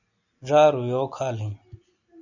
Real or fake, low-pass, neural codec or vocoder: real; 7.2 kHz; none